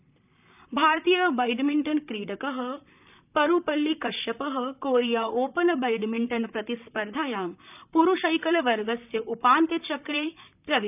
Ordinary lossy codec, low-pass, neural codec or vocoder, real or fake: none; 3.6 kHz; vocoder, 44.1 kHz, 128 mel bands, Pupu-Vocoder; fake